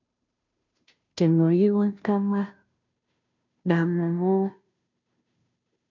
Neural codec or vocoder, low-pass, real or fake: codec, 16 kHz, 0.5 kbps, FunCodec, trained on Chinese and English, 25 frames a second; 7.2 kHz; fake